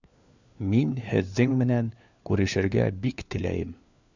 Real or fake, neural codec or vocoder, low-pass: fake; codec, 16 kHz, 4 kbps, FunCodec, trained on LibriTTS, 50 frames a second; 7.2 kHz